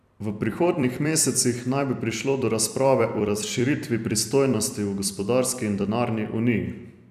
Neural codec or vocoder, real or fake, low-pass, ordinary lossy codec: vocoder, 44.1 kHz, 128 mel bands every 256 samples, BigVGAN v2; fake; 14.4 kHz; none